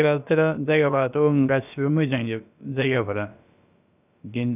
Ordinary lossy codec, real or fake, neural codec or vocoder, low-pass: none; fake; codec, 16 kHz, about 1 kbps, DyCAST, with the encoder's durations; 3.6 kHz